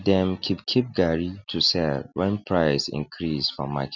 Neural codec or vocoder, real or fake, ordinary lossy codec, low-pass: none; real; none; 7.2 kHz